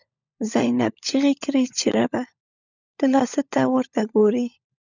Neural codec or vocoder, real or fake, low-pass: codec, 16 kHz, 16 kbps, FunCodec, trained on LibriTTS, 50 frames a second; fake; 7.2 kHz